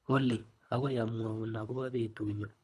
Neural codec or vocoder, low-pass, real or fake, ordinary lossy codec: codec, 24 kHz, 3 kbps, HILCodec; none; fake; none